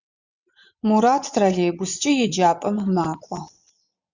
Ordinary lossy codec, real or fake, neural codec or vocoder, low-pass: Opus, 64 kbps; fake; vocoder, 22.05 kHz, 80 mel bands, WaveNeXt; 7.2 kHz